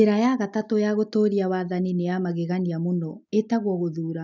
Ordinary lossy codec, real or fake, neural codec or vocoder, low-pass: none; real; none; 7.2 kHz